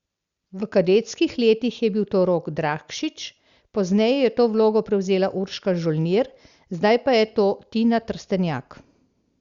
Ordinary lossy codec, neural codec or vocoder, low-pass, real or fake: Opus, 64 kbps; none; 7.2 kHz; real